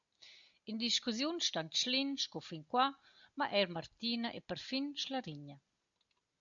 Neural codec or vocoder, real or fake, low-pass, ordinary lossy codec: none; real; 7.2 kHz; MP3, 64 kbps